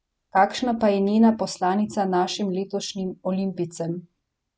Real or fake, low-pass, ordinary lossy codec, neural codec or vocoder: real; none; none; none